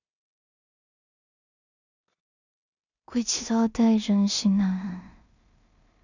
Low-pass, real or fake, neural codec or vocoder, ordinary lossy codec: 7.2 kHz; fake; codec, 16 kHz in and 24 kHz out, 0.4 kbps, LongCat-Audio-Codec, two codebook decoder; none